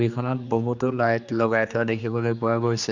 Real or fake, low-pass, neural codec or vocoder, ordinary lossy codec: fake; 7.2 kHz; codec, 16 kHz, 2 kbps, X-Codec, HuBERT features, trained on general audio; none